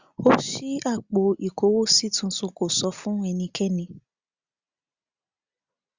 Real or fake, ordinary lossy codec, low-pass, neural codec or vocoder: real; Opus, 64 kbps; 7.2 kHz; none